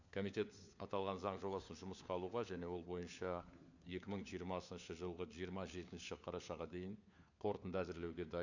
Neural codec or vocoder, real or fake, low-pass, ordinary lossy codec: codec, 16 kHz, 4 kbps, FunCodec, trained on LibriTTS, 50 frames a second; fake; 7.2 kHz; none